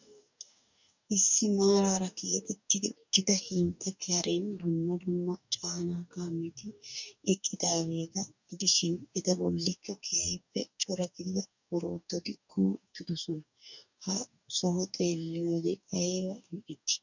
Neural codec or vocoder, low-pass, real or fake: codec, 44.1 kHz, 2.6 kbps, DAC; 7.2 kHz; fake